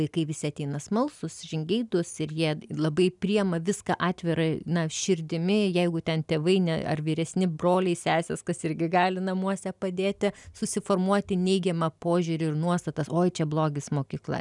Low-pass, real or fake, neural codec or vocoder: 10.8 kHz; real; none